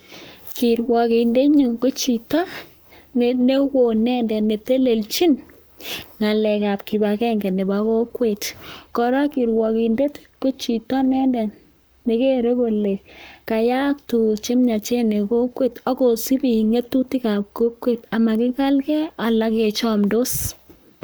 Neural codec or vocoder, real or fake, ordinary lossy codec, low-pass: codec, 44.1 kHz, 7.8 kbps, Pupu-Codec; fake; none; none